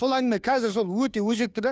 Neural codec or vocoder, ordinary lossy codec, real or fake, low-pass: codec, 16 kHz, 2 kbps, FunCodec, trained on Chinese and English, 25 frames a second; none; fake; none